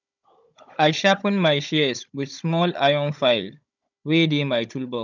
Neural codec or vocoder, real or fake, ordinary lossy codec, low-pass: codec, 16 kHz, 16 kbps, FunCodec, trained on Chinese and English, 50 frames a second; fake; none; 7.2 kHz